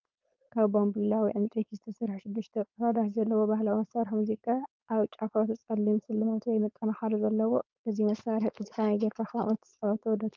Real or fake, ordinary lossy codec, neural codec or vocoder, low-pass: fake; Opus, 24 kbps; codec, 16 kHz, 4.8 kbps, FACodec; 7.2 kHz